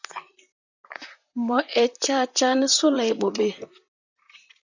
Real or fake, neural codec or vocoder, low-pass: fake; vocoder, 44.1 kHz, 128 mel bands, Pupu-Vocoder; 7.2 kHz